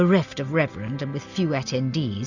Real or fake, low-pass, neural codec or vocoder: real; 7.2 kHz; none